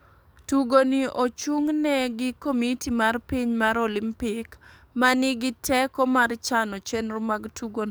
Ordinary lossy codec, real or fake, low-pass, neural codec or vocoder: none; fake; none; codec, 44.1 kHz, 7.8 kbps, DAC